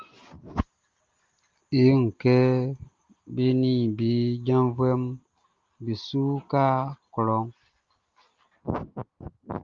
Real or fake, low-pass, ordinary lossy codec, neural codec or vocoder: real; 7.2 kHz; Opus, 32 kbps; none